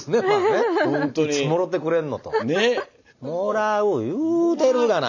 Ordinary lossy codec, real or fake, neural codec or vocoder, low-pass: none; real; none; 7.2 kHz